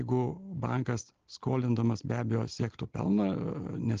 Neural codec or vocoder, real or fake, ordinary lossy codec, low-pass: none; real; Opus, 16 kbps; 7.2 kHz